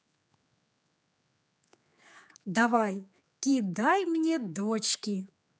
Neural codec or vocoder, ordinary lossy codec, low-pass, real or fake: codec, 16 kHz, 4 kbps, X-Codec, HuBERT features, trained on general audio; none; none; fake